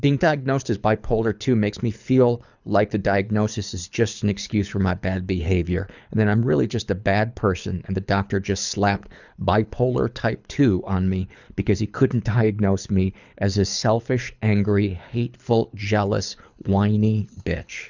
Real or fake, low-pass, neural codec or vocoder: fake; 7.2 kHz; codec, 24 kHz, 6 kbps, HILCodec